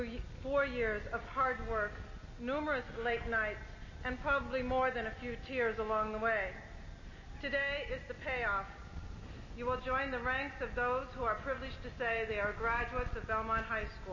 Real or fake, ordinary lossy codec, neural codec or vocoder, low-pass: real; MP3, 32 kbps; none; 7.2 kHz